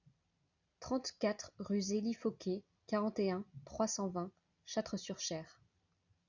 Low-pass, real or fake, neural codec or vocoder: 7.2 kHz; real; none